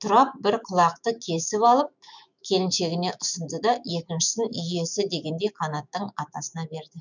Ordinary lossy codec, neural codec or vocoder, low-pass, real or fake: none; autoencoder, 48 kHz, 128 numbers a frame, DAC-VAE, trained on Japanese speech; 7.2 kHz; fake